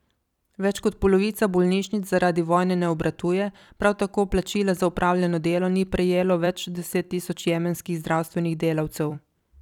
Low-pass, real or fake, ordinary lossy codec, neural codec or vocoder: 19.8 kHz; fake; none; vocoder, 44.1 kHz, 128 mel bands every 256 samples, BigVGAN v2